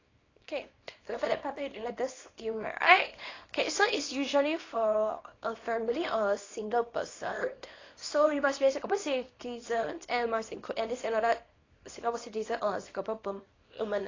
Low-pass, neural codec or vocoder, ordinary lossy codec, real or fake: 7.2 kHz; codec, 24 kHz, 0.9 kbps, WavTokenizer, small release; AAC, 32 kbps; fake